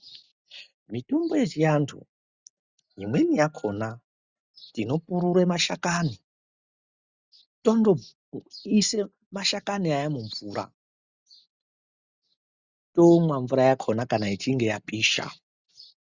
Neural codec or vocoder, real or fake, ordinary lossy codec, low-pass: none; real; Opus, 64 kbps; 7.2 kHz